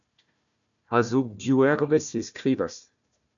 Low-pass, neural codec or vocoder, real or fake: 7.2 kHz; codec, 16 kHz, 1 kbps, FunCodec, trained on Chinese and English, 50 frames a second; fake